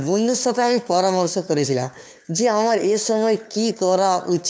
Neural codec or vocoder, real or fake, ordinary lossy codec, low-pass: codec, 16 kHz, 2 kbps, FunCodec, trained on LibriTTS, 25 frames a second; fake; none; none